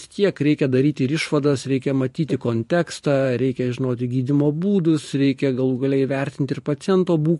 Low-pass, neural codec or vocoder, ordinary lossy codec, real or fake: 14.4 kHz; vocoder, 44.1 kHz, 128 mel bands every 512 samples, BigVGAN v2; MP3, 48 kbps; fake